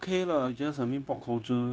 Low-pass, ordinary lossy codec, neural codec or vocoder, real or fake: none; none; codec, 16 kHz, 0.9 kbps, LongCat-Audio-Codec; fake